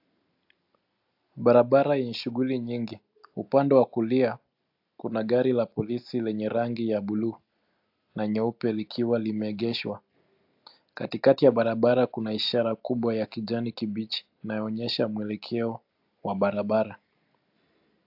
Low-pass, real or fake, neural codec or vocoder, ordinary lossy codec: 5.4 kHz; real; none; AAC, 48 kbps